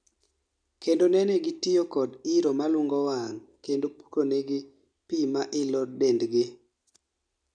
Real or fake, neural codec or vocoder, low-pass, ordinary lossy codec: real; none; 9.9 kHz; none